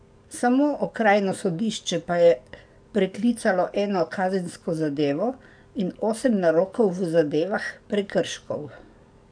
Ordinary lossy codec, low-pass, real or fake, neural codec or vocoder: none; 9.9 kHz; fake; codec, 44.1 kHz, 7.8 kbps, DAC